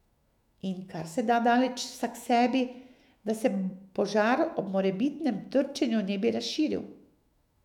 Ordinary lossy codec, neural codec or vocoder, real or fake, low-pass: none; autoencoder, 48 kHz, 128 numbers a frame, DAC-VAE, trained on Japanese speech; fake; 19.8 kHz